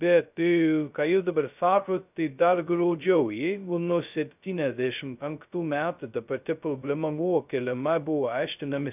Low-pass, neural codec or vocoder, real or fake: 3.6 kHz; codec, 16 kHz, 0.2 kbps, FocalCodec; fake